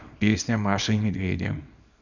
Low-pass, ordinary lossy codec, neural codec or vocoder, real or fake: 7.2 kHz; none; codec, 24 kHz, 0.9 kbps, WavTokenizer, small release; fake